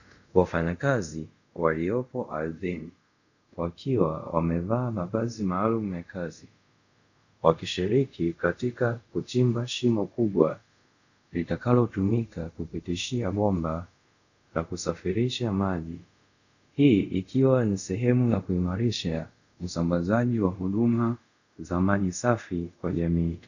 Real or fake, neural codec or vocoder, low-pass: fake; codec, 24 kHz, 0.5 kbps, DualCodec; 7.2 kHz